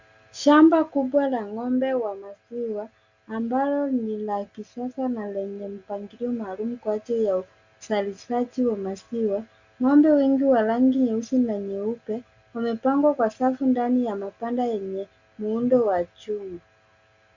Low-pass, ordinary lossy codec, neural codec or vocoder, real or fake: 7.2 kHz; Opus, 64 kbps; none; real